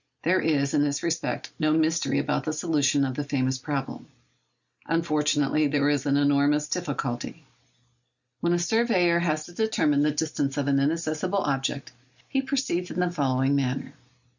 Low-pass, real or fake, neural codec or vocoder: 7.2 kHz; real; none